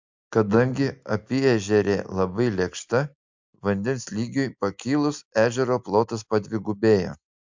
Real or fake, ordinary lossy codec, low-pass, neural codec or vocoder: real; MP3, 64 kbps; 7.2 kHz; none